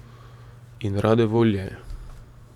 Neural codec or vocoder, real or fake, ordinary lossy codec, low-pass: vocoder, 44.1 kHz, 128 mel bands every 512 samples, BigVGAN v2; fake; none; 19.8 kHz